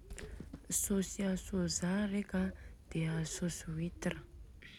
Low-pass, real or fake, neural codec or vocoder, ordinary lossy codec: 19.8 kHz; real; none; none